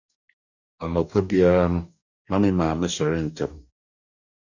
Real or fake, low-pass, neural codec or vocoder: fake; 7.2 kHz; codec, 44.1 kHz, 2.6 kbps, DAC